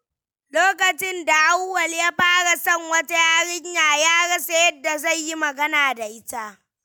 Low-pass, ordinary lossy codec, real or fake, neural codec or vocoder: none; none; real; none